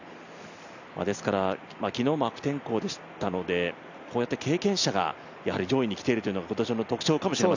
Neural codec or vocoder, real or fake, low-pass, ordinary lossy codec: none; real; 7.2 kHz; none